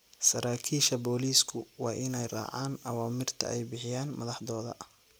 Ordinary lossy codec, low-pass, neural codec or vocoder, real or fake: none; none; none; real